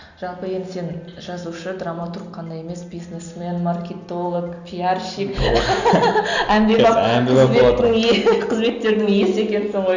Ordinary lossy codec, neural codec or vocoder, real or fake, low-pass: none; none; real; 7.2 kHz